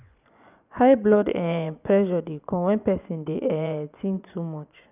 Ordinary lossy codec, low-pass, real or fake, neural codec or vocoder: none; 3.6 kHz; fake; vocoder, 24 kHz, 100 mel bands, Vocos